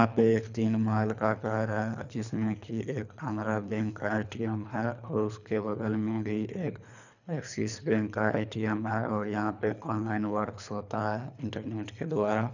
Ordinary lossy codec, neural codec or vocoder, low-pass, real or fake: none; codec, 24 kHz, 3 kbps, HILCodec; 7.2 kHz; fake